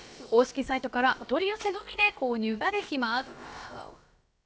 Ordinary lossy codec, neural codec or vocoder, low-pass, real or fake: none; codec, 16 kHz, about 1 kbps, DyCAST, with the encoder's durations; none; fake